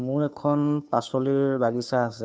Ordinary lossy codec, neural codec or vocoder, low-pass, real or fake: none; codec, 16 kHz, 2 kbps, FunCodec, trained on Chinese and English, 25 frames a second; none; fake